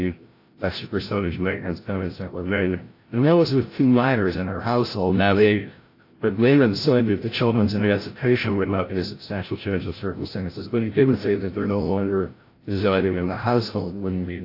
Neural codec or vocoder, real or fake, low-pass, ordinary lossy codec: codec, 16 kHz, 0.5 kbps, FreqCodec, larger model; fake; 5.4 kHz; AAC, 32 kbps